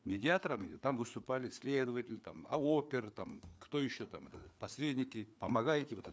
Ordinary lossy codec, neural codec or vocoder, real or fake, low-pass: none; codec, 16 kHz, 4 kbps, FreqCodec, larger model; fake; none